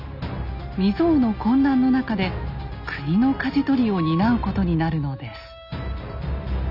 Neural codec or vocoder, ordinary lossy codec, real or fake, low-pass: none; none; real; 5.4 kHz